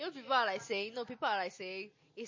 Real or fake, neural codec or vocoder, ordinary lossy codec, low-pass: real; none; MP3, 32 kbps; 7.2 kHz